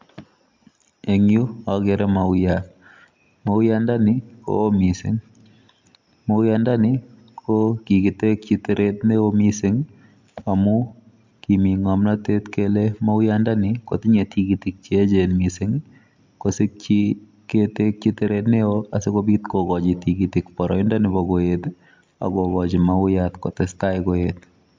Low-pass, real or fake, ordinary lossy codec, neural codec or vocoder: 7.2 kHz; real; MP3, 64 kbps; none